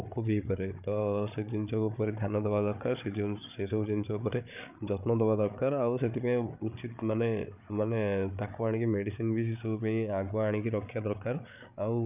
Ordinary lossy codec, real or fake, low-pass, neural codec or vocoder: none; fake; 3.6 kHz; codec, 16 kHz, 8 kbps, FreqCodec, larger model